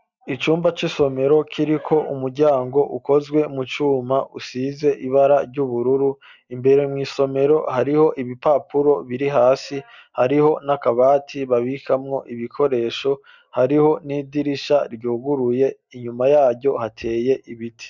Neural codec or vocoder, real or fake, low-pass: none; real; 7.2 kHz